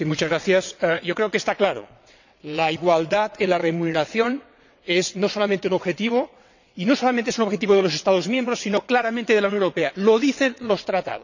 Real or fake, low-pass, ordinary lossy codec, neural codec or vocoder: fake; 7.2 kHz; none; vocoder, 22.05 kHz, 80 mel bands, WaveNeXt